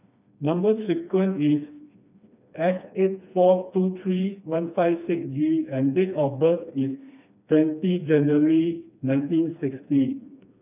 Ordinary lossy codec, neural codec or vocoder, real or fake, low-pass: none; codec, 16 kHz, 2 kbps, FreqCodec, smaller model; fake; 3.6 kHz